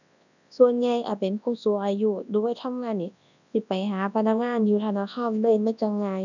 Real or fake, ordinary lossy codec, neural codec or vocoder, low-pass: fake; none; codec, 24 kHz, 0.9 kbps, WavTokenizer, large speech release; 7.2 kHz